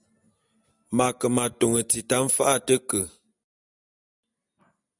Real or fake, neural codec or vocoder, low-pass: real; none; 10.8 kHz